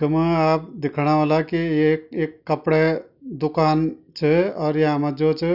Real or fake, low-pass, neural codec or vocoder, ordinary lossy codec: real; 5.4 kHz; none; none